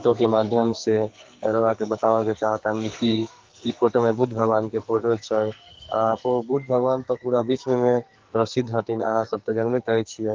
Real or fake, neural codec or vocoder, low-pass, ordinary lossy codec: fake; codec, 44.1 kHz, 2.6 kbps, SNAC; 7.2 kHz; Opus, 32 kbps